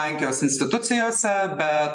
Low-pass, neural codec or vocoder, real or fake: 10.8 kHz; vocoder, 44.1 kHz, 128 mel bands every 512 samples, BigVGAN v2; fake